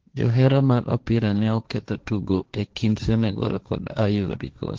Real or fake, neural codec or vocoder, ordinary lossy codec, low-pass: fake; codec, 16 kHz, 1.1 kbps, Voila-Tokenizer; Opus, 16 kbps; 7.2 kHz